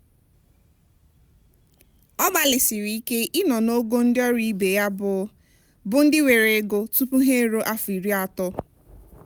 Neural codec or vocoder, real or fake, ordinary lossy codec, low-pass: none; real; none; none